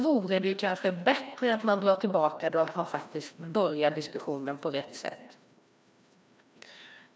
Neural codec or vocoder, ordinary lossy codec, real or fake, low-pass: codec, 16 kHz, 1 kbps, FreqCodec, larger model; none; fake; none